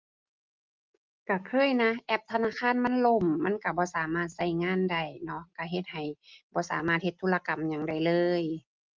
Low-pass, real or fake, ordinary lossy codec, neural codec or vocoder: 7.2 kHz; real; Opus, 24 kbps; none